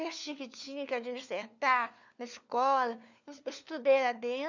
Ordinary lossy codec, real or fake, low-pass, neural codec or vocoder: none; fake; 7.2 kHz; codec, 16 kHz, 4 kbps, FunCodec, trained on LibriTTS, 50 frames a second